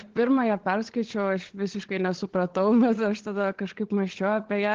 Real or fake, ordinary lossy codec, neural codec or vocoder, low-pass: fake; Opus, 16 kbps; codec, 16 kHz, 16 kbps, FunCodec, trained on LibriTTS, 50 frames a second; 7.2 kHz